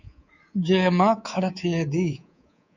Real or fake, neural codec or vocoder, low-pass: fake; codec, 24 kHz, 3.1 kbps, DualCodec; 7.2 kHz